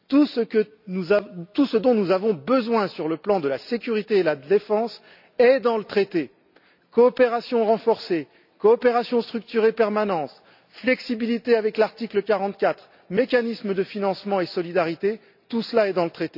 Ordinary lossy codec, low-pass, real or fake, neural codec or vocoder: none; 5.4 kHz; real; none